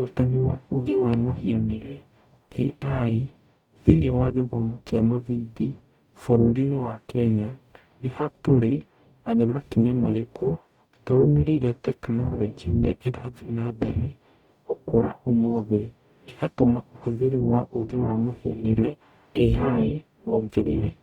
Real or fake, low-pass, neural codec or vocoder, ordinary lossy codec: fake; 19.8 kHz; codec, 44.1 kHz, 0.9 kbps, DAC; Opus, 64 kbps